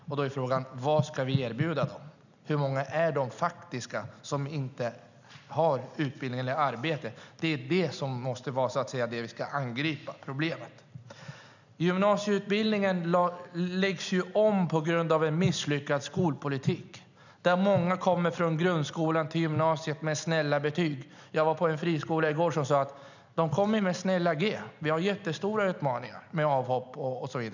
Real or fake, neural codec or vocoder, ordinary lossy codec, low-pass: real; none; none; 7.2 kHz